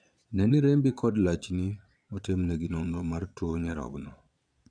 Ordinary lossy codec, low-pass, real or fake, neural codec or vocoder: none; 9.9 kHz; fake; vocoder, 22.05 kHz, 80 mel bands, Vocos